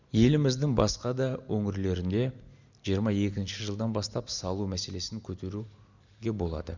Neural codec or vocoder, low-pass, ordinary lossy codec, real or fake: none; 7.2 kHz; none; real